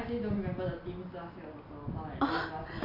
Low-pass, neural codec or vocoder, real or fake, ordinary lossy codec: 5.4 kHz; none; real; AAC, 32 kbps